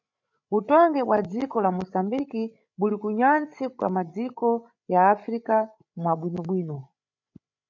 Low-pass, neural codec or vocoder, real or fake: 7.2 kHz; codec, 16 kHz, 8 kbps, FreqCodec, larger model; fake